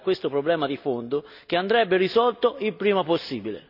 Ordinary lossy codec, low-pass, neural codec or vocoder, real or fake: none; 5.4 kHz; none; real